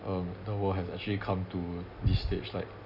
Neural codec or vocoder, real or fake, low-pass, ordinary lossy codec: none; real; 5.4 kHz; none